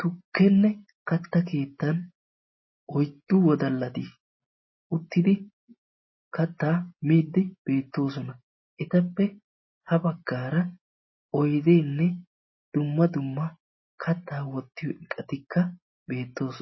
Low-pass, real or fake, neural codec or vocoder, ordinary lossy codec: 7.2 kHz; real; none; MP3, 24 kbps